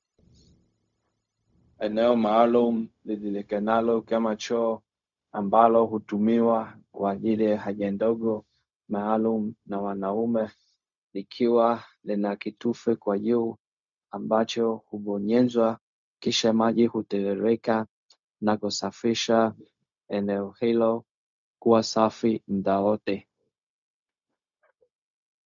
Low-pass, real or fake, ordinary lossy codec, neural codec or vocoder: 7.2 kHz; fake; MP3, 64 kbps; codec, 16 kHz, 0.4 kbps, LongCat-Audio-Codec